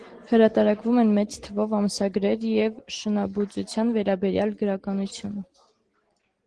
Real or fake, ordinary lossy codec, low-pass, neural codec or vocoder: real; Opus, 16 kbps; 9.9 kHz; none